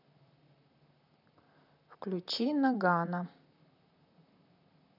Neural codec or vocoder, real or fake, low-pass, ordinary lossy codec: vocoder, 44.1 kHz, 128 mel bands, Pupu-Vocoder; fake; 5.4 kHz; none